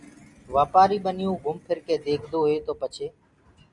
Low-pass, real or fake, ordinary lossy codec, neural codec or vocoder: 10.8 kHz; real; Opus, 64 kbps; none